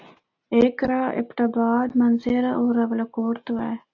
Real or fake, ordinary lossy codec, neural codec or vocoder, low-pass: real; AAC, 48 kbps; none; 7.2 kHz